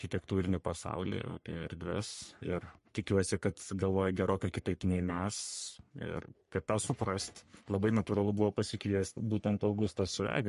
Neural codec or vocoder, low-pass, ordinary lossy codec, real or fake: codec, 44.1 kHz, 3.4 kbps, Pupu-Codec; 14.4 kHz; MP3, 48 kbps; fake